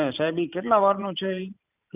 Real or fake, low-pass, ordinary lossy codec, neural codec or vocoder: fake; 3.6 kHz; none; vocoder, 44.1 kHz, 128 mel bands every 512 samples, BigVGAN v2